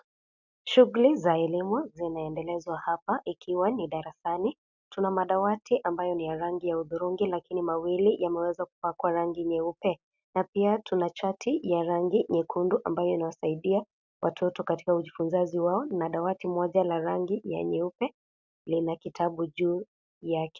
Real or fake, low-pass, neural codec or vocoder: real; 7.2 kHz; none